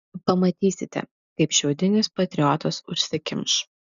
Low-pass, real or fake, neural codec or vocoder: 7.2 kHz; real; none